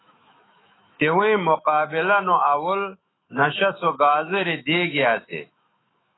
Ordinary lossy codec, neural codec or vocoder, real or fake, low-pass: AAC, 16 kbps; autoencoder, 48 kHz, 128 numbers a frame, DAC-VAE, trained on Japanese speech; fake; 7.2 kHz